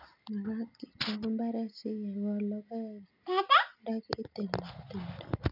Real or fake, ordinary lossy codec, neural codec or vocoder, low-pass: real; none; none; 5.4 kHz